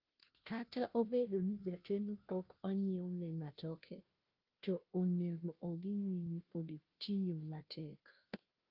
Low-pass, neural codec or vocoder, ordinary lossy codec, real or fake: 5.4 kHz; codec, 16 kHz, 0.5 kbps, FunCodec, trained on Chinese and English, 25 frames a second; Opus, 24 kbps; fake